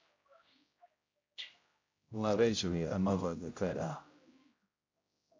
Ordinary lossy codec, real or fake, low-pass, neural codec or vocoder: AAC, 48 kbps; fake; 7.2 kHz; codec, 16 kHz, 0.5 kbps, X-Codec, HuBERT features, trained on general audio